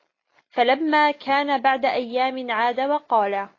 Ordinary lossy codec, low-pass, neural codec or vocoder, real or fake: AAC, 32 kbps; 7.2 kHz; none; real